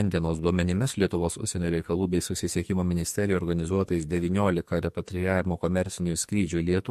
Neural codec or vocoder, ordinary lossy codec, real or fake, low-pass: codec, 44.1 kHz, 2.6 kbps, SNAC; MP3, 64 kbps; fake; 14.4 kHz